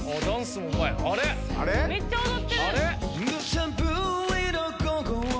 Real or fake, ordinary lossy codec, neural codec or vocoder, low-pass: real; none; none; none